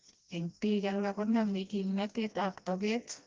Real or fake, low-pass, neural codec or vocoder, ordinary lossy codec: fake; 7.2 kHz; codec, 16 kHz, 1 kbps, FreqCodec, smaller model; Opus, 32 kbps